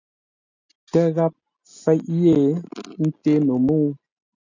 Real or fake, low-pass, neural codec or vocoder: real; 7.2 kHz; none